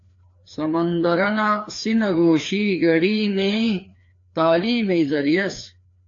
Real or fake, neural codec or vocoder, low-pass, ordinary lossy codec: fake; codec, 16 kHz, 2 kbps, FreqCodec, larger model; 7.2 kHz; AAC, 48 kbps